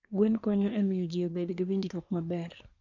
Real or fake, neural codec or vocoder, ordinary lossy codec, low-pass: fake; codec, 24 kHz, 1 kbps, SNAC; none; 7.2 kHz